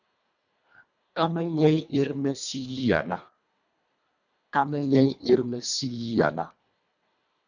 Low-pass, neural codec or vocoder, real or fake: 7.2 kHz; codec, 24 kHz, 1.5 kbps, HILCodec; fake